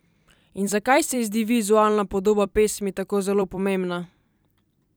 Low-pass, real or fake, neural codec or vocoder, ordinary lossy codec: none; fake; vocoder, 44.1 kHz, 128 mel bands every 256 samples, BigVGAN v2; none